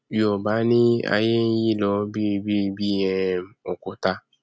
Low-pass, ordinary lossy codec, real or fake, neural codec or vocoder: none; none; real; none